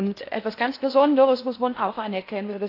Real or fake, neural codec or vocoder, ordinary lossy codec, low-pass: fake; codec, 16 kHz in and 24 kHz out, 0.8 kbps, FocalCodec, streaming, 65536 codes; AAC, 32 kbps; 5.4 kHz